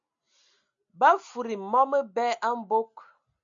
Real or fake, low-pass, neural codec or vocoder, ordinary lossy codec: real; 7.2 kHz; none; MP3, 64 kbps